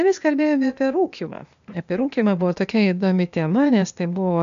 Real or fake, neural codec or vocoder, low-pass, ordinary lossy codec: fake; codec, 16 kHz, 0.8 kbps, ZipCodec; 7.2 kHz; MP3, 64 kbps